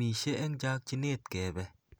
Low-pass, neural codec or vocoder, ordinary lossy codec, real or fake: none; none; none; real